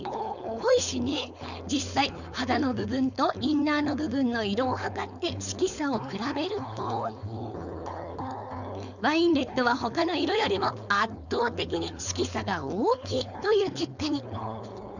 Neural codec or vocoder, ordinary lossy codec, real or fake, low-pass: codec, 16 kHz, 4.8 kbps, FACodec; none; fake; 7.2 kHz